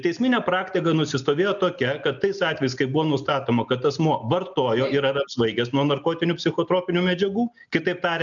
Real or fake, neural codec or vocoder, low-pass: real; none; 7.2 kHz